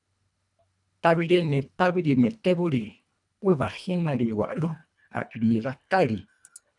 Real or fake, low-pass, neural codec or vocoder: fake; 10.8 kHz; codec, 24 kHz, 1.5 kbps, HILCodec